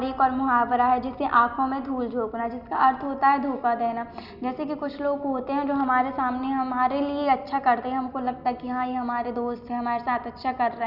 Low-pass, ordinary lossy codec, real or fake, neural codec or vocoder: 5.4 kHz; Opus, 64 kbps; real; none